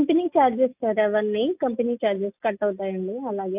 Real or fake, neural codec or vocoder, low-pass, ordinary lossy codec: real; none; 3.6 kHz; none